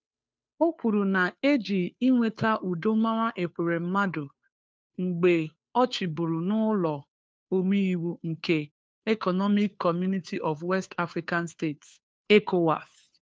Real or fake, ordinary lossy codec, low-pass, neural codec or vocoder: fake; none; none; codec, 16 kHz, 2 kbps, FunCodec, trained on Chinese and English, 25 frames a second